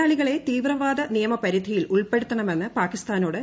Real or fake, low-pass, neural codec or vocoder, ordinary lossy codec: real; none; none; none